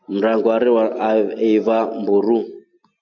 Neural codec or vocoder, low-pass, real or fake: none; 7.2 kHz; real